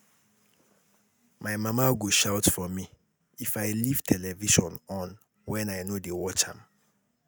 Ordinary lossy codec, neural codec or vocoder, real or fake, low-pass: none; none; real; none